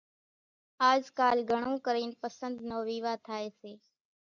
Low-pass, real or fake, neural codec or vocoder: 7.2 kHz; real; none